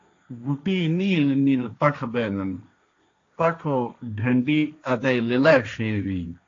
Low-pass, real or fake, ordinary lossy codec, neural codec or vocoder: 7.2 kHz; fake; AAC, 48 kbps; codec, 16 kHz, 1.1 kbps, Voila-Tokenizer